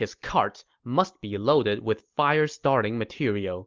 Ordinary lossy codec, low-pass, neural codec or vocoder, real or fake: Opus, 32 kbps; 7.2 kHz; none; real